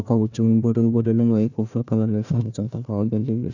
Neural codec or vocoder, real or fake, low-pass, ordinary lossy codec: codec, 16 kHz, 1 kbps, FunCodec, trained on Chinese and English, 50 frames a second; fake; 7.2 kHz; none